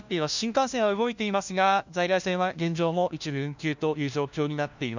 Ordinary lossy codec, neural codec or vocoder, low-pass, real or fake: none; codec, 16 kHz, 1 kbps, FunCodec, trained on LibriTTS, 50 frames a second; 7.2 kHz; fake